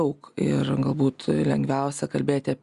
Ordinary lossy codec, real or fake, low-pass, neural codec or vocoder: Opus, 64 kbps; real; 10.8 kHz; none